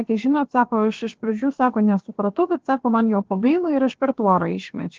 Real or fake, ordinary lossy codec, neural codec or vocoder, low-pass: fake; Opus, 16 kbps; codec, 16 kHz, about 1 kbps, DyCAST, with the encoder's durations; 7.2 kHz